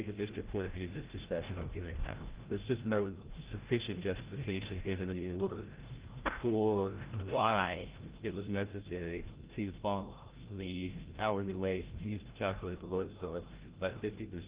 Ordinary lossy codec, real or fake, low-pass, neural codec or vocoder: Opus, 16 kbps; fake; 3.6 kHz; codec, 16 kHz, 0.5 kbps, FreqCodec, larger model